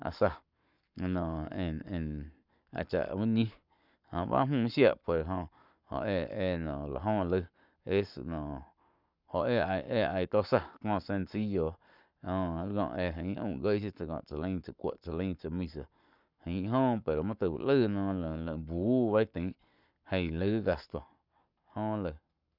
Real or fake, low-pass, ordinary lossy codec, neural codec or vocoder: fake; 5.4 kHz; none; codec, 44.1 kHz, 7.8 kbps, Pupu-Codec